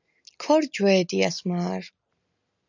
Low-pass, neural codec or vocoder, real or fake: 7.2 kHz; none; real